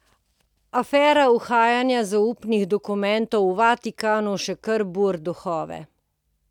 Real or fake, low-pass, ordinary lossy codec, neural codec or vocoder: real; 19.8 kHz; none; none